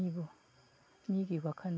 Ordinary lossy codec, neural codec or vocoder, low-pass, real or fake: none; none; none; real